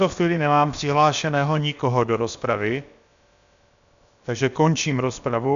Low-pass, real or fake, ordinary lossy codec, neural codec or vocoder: 7.2 kHz; fake; AAC, 96 kbps; codec, 16 kHz, about 1 kbps, DyCAST, with the encoder's durations